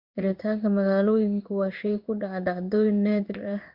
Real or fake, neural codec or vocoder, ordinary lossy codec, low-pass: fake; codec, 16 kHz in and 24 kHz out, 1 kbps, XY-Tokenizer; none; 5.4 kHz